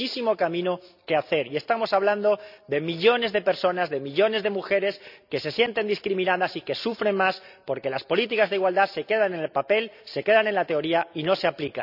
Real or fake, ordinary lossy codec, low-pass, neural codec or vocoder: real; none; 5.4 kHz; none